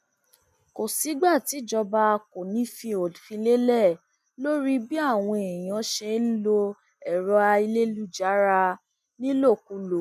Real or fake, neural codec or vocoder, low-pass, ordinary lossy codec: real; none; 14.4 kHz; none